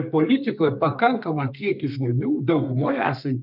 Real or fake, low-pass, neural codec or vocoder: fake; 5.4 kHz; codec, 32 kHz, 1.9 kbps, SNAC